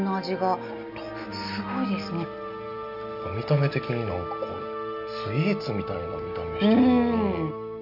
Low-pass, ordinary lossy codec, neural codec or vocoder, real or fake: 5.4 kHz; none; none; real